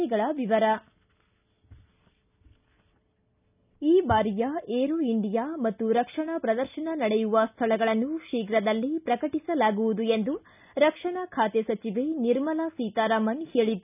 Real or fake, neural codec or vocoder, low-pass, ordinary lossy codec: real; none; 3.6 kHz; none